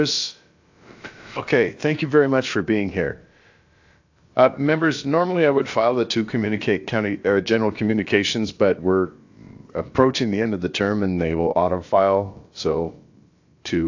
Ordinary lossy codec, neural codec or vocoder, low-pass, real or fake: AAC, 48 kbps; codec, 16 kHz, about 1 kbps, DyCAST, with the encoder's durations; 7.2 kHz; fake